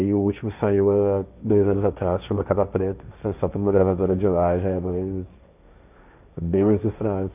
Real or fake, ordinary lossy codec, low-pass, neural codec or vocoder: fake; none; 3.6 kHz; codec, 16 kHz, 1.1 kbps, Voila-Tokenizer